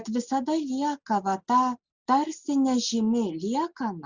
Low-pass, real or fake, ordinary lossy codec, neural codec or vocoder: 7.2 kHz; real; Opus, 64 kbps; none